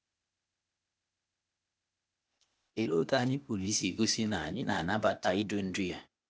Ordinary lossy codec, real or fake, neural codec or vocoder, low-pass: none; fake; codec, 16 kHz, 0.8 kbps, ZipCodec; none